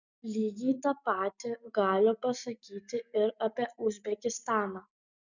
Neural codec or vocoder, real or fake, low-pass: none; real; 7.2 kHz